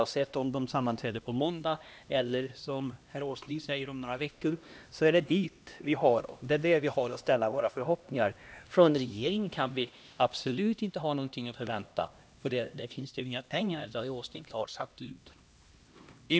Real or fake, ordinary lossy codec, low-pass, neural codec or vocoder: fake; none; none; codec, 16 kHz, 1 kbps, X-Codec, HuBERT features, trained on LibriSpeech